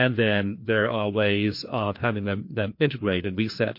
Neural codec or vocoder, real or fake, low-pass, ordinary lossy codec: codec, 16 kHz, 2 kbps, FreqCodec, larger model; fake; 5.4 kHz; MP3, 32 kbps